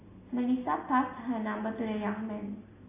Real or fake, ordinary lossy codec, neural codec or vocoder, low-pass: real; AAC, 16 kbps; none; 3.6 kHz